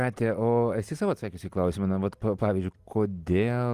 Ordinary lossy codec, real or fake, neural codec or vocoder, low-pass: Opus, 24 kbps; real; none; 14.4 kHz